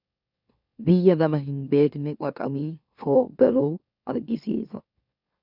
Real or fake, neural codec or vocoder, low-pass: fake; autoencoder, 44.1 kHz, a latent of 192 numbers a frame, MeloTTS; 5.4 kHz